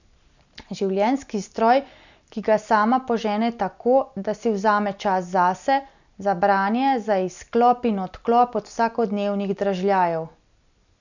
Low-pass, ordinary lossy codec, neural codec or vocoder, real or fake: 7.2 kHz; none; none; real